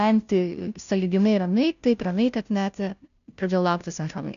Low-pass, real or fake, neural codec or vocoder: 7.2 kHz; fake; codec, 16 kHz, 0.5 kbps, FunCodec, trained on Chinese and English, 25 frames a second